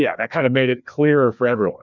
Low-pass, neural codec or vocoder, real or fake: 7.2 kHz; codec, 16 kHz, 2 kbps, FreqCodec, larger model; fake